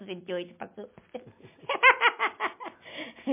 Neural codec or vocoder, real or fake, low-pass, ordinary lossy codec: none; real; 3.6 kHz; MP3, 24 kbps